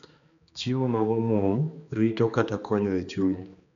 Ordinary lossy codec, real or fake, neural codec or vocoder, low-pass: MP3, 64 kbps; fake; codec, 16 kHz, 2 kbps, X-Codec, HuBERT features, trained on balanced general audio; 7.2 kHz